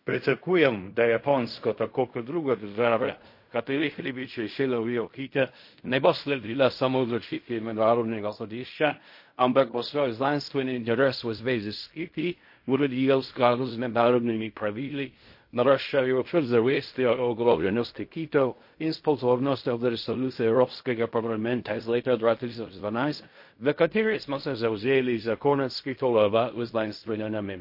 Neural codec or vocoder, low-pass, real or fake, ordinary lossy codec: codec, 16 kHz in and 24 kHz out, 0.4 kbps, LongCat-Audio-Codec, fine tuned four codebook decoder; 5.4 kHz; fake; MP3, 32 kbps